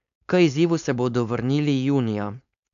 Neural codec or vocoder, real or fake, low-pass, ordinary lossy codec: codec, 16 kHz, 4.8 kbps, FACodec; fake; 7.2 kHz; none